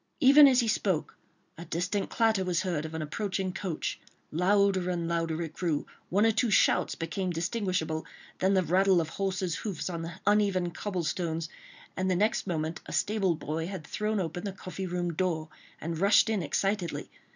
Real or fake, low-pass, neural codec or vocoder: real; 7.2 kHz; none